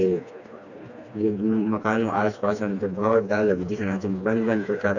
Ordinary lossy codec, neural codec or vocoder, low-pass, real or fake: none; codec, 16 kHz, 2 kbps, FreqCodec, smaller model; 7.2 kHz; fake